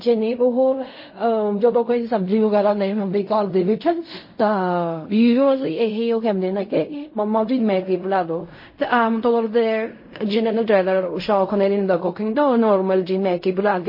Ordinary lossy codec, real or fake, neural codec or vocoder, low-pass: MP3, 24 kbps; fake; codec, 16 kHz in and 24 kHz out, 0.4 kbps, LongCat-Audio-Codec, fine tuned four codebook decoder; 5.4 kHz